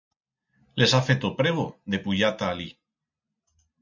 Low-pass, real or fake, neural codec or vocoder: 7.2 kHz; real; none